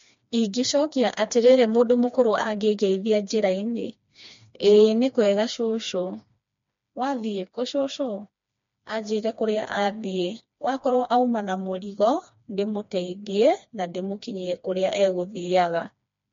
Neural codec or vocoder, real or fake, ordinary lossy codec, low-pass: codec, 16 kHz, 2 kbps, FreqCodec, smaller model; fake; MP3, 48 kbps; 7.2 kHz